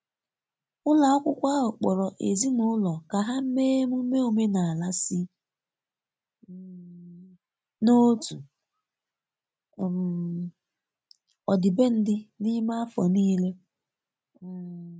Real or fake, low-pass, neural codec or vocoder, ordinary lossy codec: real; none; none; none